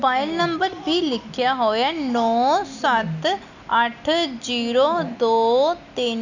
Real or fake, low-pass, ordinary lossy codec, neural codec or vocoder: real; 7.2 kHz; none; none